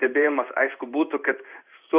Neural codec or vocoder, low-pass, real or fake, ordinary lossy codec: codec, 16 kHz in and 24 kHz out, 1 kbps, XY-Tokenizer; 3.6 kHz; fake; Opus, 64 kbps